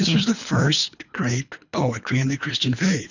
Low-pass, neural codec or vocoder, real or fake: 7.2 kHz; codec, 24 kHz, 3 kbps, HILCodec; fake